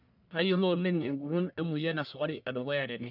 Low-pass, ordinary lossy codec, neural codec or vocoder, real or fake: 5.4 kHz; none; codec, 44.1 kHz, 1.7 kbps, Pupu-Codec; fake